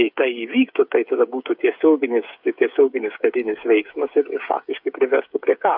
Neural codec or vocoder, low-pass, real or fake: codec, 16 kHz, 8 kbps, FreqCodec, smaller model; 5.4 kHz; fake